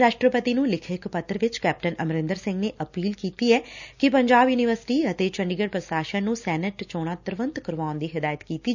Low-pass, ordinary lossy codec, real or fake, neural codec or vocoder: 7.2 kHz; none; real; none